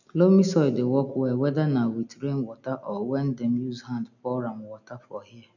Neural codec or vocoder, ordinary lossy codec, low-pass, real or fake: none; none; 7.2 kHz; real